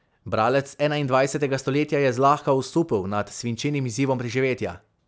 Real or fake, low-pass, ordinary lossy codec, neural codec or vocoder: real; none; none; none